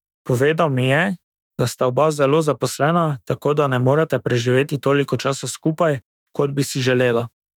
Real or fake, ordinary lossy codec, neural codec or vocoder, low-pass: fake; none; autoencoder, 48 kHz, 32 numbers a frame, DAC-VAE, trained on Japanese speech; 19.8 kHz